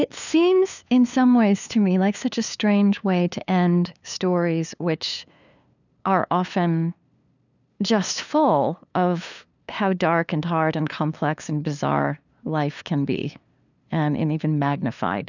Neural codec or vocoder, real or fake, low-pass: codec, 16 kHz, 2 kbps, FunCodec, trained on LibriTTS, 25 frames a second; fake; 7.2 kHz